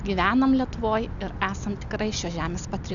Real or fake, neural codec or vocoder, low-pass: real; none; 7.2 kHz